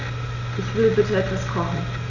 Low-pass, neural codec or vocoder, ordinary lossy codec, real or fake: 7.2 kHz; none; none; real